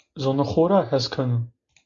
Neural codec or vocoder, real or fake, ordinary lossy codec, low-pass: none; real; AAC, 64 kbps; 7.2 kHz